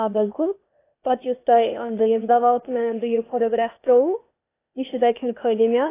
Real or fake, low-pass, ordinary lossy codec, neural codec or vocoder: fake; 3.6 kHz; AAC, 24 kbps; codec, 16 kHz, 0.8 kbps, ZipCodec